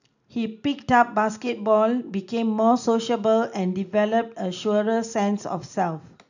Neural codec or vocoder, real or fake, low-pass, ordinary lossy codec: none; real; 7.2 kHz; none